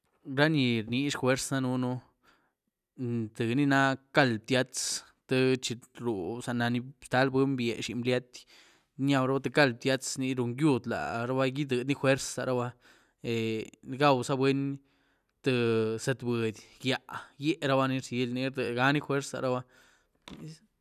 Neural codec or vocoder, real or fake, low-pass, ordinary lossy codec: none; real; 14.4 kHz; none